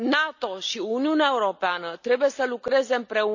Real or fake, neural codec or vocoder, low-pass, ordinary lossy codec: real; none; 7.2 kHz; none